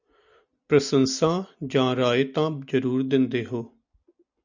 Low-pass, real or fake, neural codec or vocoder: 7.2 kHz; real; none